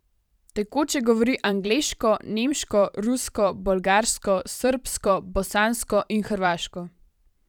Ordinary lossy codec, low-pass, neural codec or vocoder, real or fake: none; 19.8 kHz; vocoder, 44.1 kHz, 128 mel bands every 512 samples, BigVGAN v2; fake